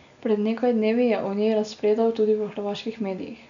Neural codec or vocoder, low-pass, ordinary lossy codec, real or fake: none; 7.2 kHz; none; real